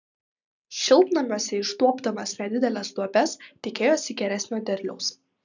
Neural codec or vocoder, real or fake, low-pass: none; real; 7.2 kHz